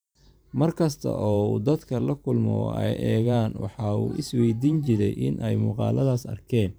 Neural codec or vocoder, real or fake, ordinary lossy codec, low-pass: vocoder, 44.1 kHz, 128 mel bands every 512 samples, BigVGAN v2; fake; none; none